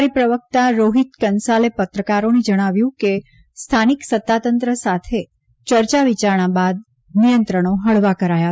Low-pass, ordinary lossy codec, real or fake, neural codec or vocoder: none; none; real; none